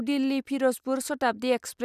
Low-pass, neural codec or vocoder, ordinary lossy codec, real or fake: 14.4 kHz; none; none; real